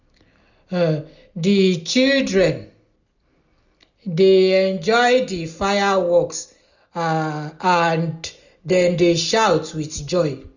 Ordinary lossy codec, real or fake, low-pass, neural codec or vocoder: none; real; 7.2 kHz; none